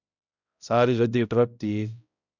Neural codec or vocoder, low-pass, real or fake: codec, 16 kHz, 0.5 kbps, X-Codec, HuBERT features, trained on balanced general audio; 7.2 kHz; fake